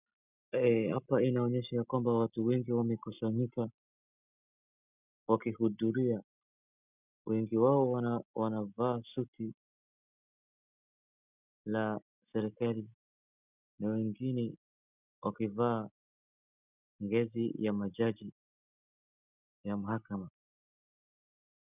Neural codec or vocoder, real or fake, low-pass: none; real; 3.6 kHz